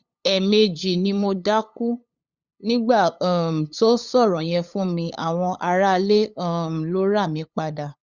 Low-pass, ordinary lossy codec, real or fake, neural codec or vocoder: 7.2 kHz; Opus, 64 kbps; fake; codec, 16 kHz, 8 kbps, FunCodec, trained on LibriTTS, 25 frames a second